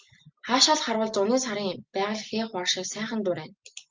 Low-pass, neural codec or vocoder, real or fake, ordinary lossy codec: 7.2 kHz; none; real; Opus, 32 kbps